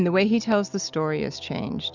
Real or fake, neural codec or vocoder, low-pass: real; none; 7.2 kHz